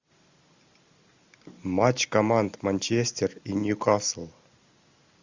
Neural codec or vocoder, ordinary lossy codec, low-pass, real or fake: none; Opus, 64 kbps; 7.2 kHz; real